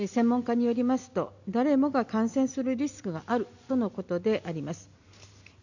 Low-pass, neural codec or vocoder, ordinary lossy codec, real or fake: 7.2 kHz; none; none; real